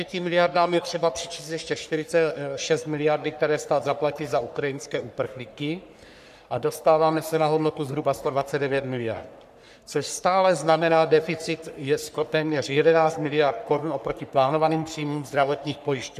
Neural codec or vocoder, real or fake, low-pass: codec, 44.1 kHz, 3.4 kbps, Pupu-Codec; fake; 14.4 kHz